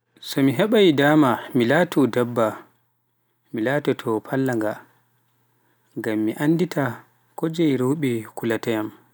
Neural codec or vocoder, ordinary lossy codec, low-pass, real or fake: none; none; none; real